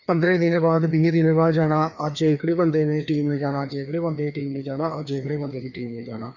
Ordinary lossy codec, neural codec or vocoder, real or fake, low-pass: none; codec, 16 kHz, 2 kbps, FreqCodec, larger model; fake; 7.2 kHz